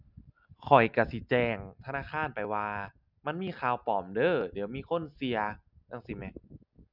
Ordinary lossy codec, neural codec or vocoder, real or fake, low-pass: none; none; real; 5.4 kHz